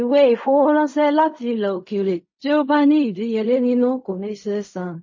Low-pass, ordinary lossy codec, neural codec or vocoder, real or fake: 7.2 kHz; MP3, 32 kbps; codec, 16 kHz in and 24 kHz out, 0.4 kbps, LongCat-Audio-Codec, fine tuned four codebook decoder; fake